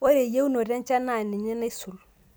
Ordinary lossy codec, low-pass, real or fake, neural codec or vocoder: none; none; real; none